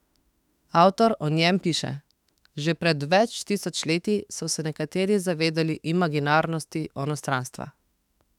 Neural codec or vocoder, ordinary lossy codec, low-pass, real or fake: autoencoder, 48 kHz, 32 numbers a frame, DAC-VAE, trained on Japanese speech; none; 19.8 kHz; fake